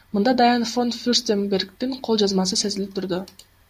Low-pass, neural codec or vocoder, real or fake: 14.4 kHz; none; real